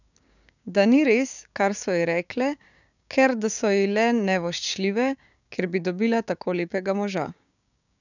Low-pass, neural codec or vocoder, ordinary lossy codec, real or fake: 7.2 kHz; codec, 16 kHz, 6 kbps, DAC; none; fake